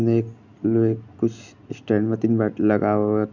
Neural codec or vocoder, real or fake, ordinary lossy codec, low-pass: none; real; none; 7.2 kHz